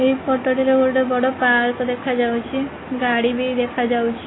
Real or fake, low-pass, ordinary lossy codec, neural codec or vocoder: real; 7.2 kHz; AAC, 16 kbps; none